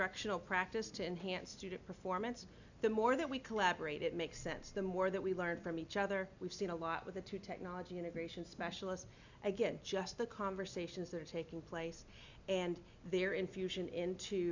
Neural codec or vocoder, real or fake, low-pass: none; real; 7.2 kHz